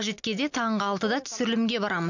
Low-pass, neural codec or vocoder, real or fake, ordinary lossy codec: 7.2 kHz; none; real; none